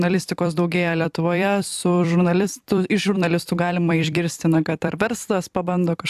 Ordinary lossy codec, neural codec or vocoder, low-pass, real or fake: AAC, 96 kbps; vocoder, 44.1 kHz, 128 mel bands every 256 samples, BigVGAN v2; 14.4 kHz; fake